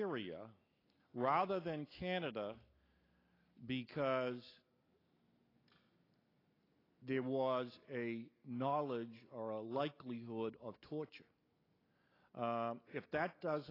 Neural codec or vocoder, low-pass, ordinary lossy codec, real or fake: none; 5.4 kHz; AAC, 24 kbps; real